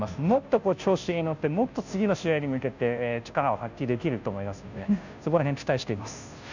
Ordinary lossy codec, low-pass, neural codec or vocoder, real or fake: none; 7.2 kHz; codec, 16 kHz, 0.5 kbps, FunCodec, trained on Chinese and English, 25 frames a second; fake